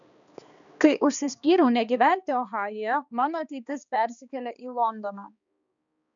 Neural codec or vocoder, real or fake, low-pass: codec, 16 kHz, 2 kbps, X-Codec, HuBERT features, trained on balanced general audio; fake; 7.2 kHz